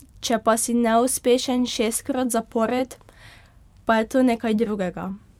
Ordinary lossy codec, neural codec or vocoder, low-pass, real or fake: MP3, 96 kbps; vocoder, 44.1 kHz, 128 mel bands every 512 samples, BigVGAN v2; 19.8 kHz; fake